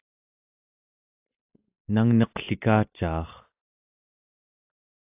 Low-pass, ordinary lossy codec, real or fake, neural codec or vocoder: 3.6 kHz; AAC, 32 kbps; real; none